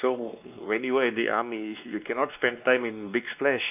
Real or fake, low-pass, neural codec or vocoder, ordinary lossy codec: fake; 3.6 kHz; codec, 16 kHz, 2 kbps, X-Codec, WavLM features, trained on Multilingual LibriSpeech; AAC, 32 kbps